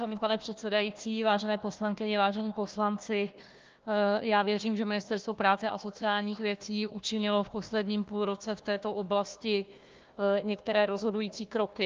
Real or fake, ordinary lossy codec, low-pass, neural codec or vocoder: fake; Opus, 24 kbps; 7.2 kHz; codec, 16 kHz, 1 kbps, FunCodec, trained on Chinese and English, 50 frames a second